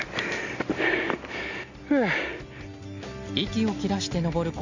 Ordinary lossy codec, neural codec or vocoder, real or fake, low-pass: Opus, 64 kbps; none; real; 7.2 kHz